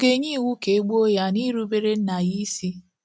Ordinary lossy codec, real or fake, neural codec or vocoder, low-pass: none; real; none; none